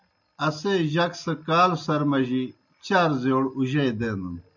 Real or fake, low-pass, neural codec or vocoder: real; 7.2 kHz; none